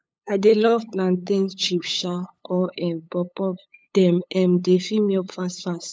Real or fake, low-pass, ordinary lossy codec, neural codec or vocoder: fake; none; none; codec, 16 kHz, 8 kbps, FunCodec, trained on LibriTTS, 25 frames a second